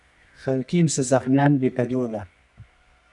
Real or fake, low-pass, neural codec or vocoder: fake; 10.8 kHz; codec, 24 kHz, 0.9 kbps, WavTokenizer, medium music audio release